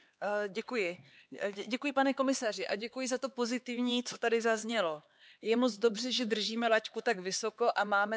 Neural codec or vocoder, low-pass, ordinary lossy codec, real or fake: codec, 16 kHz, 4 kbps, X-Codec, HuBERT features, trained on LibriSpeech; none; none; fake